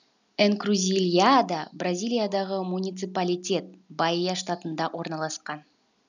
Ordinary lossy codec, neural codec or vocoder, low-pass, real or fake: none; none; 7.2 kHz; real